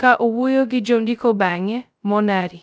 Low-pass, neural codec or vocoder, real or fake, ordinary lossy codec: none; codec, 16 kHz, 0.2 kbps, FocalCodec; fake; none